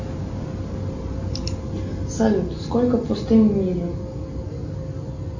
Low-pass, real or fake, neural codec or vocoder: 7.2 kHz; real; none